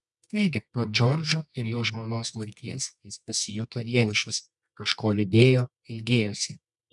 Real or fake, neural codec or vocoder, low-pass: fake; codec, 24 kHz, 0.9 kbps, WavTokenizer, medium music audio release; 10.8 kHz